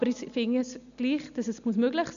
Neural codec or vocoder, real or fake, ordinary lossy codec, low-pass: none; real; none; 7.2 kHz